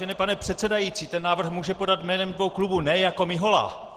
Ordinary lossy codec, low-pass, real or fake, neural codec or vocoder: Opus, 16 kbps; 14.4 kHz; real; none